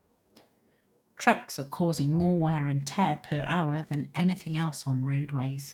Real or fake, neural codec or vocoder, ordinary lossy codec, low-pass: fake; codec, 44.1 kHz, 2.6 kbps, DAC; none; 19.8 kHz